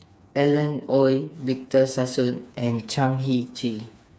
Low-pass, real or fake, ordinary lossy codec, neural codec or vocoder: none; fake; none; codec, 16 kHz, 4 kbps, FreqCodec, smaller model